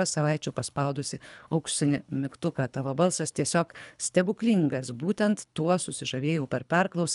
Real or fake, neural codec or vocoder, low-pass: fake; codec, 24 kHz, 3 kbps, HILCodec; 10.8 kHz